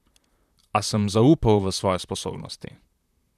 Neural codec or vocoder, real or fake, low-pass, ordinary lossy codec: vocoder, 44.1 kHz, 128 mel bands, Pupu-Vocoder; fake; 14.4 kHz; none